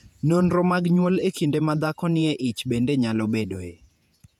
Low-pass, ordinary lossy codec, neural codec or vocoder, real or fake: 19.8 kHz; none; vocoder, 48 kHz, 128 mel bands, Vocos; fake